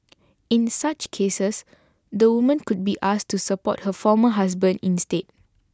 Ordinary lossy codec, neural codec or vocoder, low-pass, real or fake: none; none; none; real